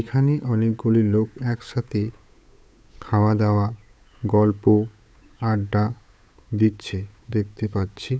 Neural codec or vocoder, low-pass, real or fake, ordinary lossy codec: codec, 16 kHz, 8 kbps, FunCodec, trained on Chinese and English, 25 frames a second; none; fake; none